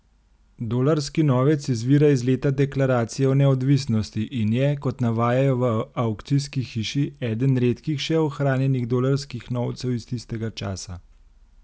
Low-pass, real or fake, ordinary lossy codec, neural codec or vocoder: none; real; none; none